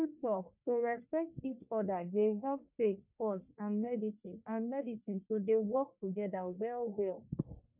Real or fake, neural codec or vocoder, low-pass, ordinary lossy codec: fake; codec, 44.1 kHz, 1.7 kbps, Pupu-Codec; 3.6 kHz; none